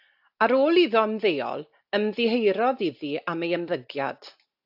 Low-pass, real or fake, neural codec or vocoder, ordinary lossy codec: 5.4 kHz; real; none; AAC, 48 kbps